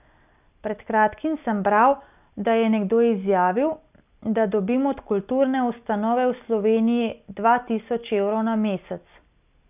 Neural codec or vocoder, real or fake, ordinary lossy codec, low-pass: none; real; none; 3.6 kHz